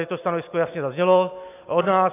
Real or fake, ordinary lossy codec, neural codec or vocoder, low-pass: real; AAC, 24 kbps; none; 3.6 kHz